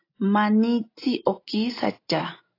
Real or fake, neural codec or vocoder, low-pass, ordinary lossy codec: real; none; 5.4 kHz; AAC, 32 kbps